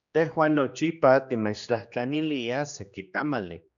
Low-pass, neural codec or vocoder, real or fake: 7.2 kHz; codec, 16 kHz, 2 kbps, X-Codec, HuBERT features, trained on general audio; fake